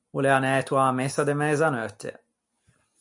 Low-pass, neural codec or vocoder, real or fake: 10.8 kHz; none; real